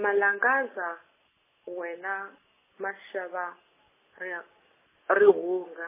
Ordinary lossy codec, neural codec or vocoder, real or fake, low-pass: MP3, 16 kbps; none; real; 3.6 kHz